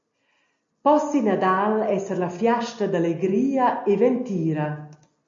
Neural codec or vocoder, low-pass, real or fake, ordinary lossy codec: none; 7.2 kHz; real; MP3, 48 kbps